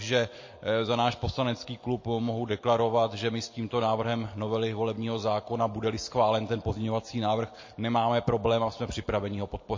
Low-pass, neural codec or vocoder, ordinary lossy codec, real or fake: 7.2 kHz; none; MP3, 32 kbps; real